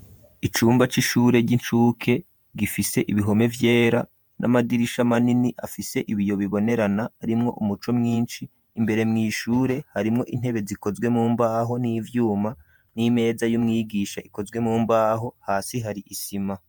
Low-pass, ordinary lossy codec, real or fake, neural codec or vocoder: 19.8 kHz; MP3, 96 kbps; fake; vocoder, 48 kHz, 128 mel bands, Vocos